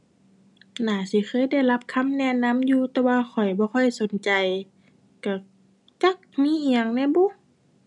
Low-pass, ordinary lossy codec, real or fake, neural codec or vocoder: 10.8 kHz; none; real; none